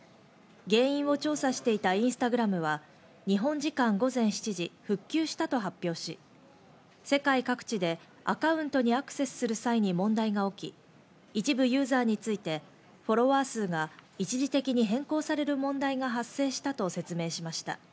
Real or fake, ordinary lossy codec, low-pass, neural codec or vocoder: real; none; none; none